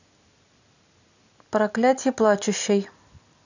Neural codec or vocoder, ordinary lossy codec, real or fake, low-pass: none; none; real; 7.2 kHz